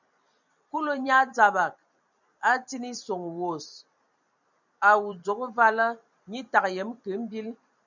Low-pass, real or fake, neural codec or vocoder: 7.2 kHz; fake; vocoder, 44.1 kHz, 128 mel bands every 256 samples, BigVGAN v2